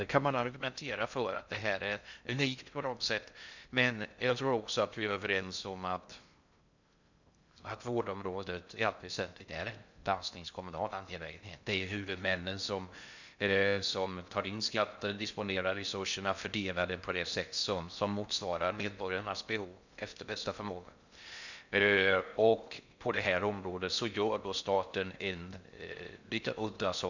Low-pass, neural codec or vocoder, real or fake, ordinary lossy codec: 7.2 kHz; codec, 16 kHz in and 24 kHz out, 0.6 kbps, FocalCodec, streaming, 2048 codes; fake; none